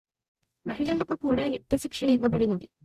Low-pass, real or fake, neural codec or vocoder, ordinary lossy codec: 14.4 kHz; fake; codec, 44.1 kHz, 0.9 kbps, DAC; Opus, 64 kbps